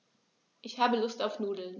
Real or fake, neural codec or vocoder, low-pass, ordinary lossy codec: real; none; 7.2 kHz; none